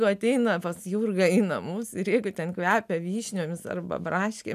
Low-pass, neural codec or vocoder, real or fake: 14.4 kHz; none; real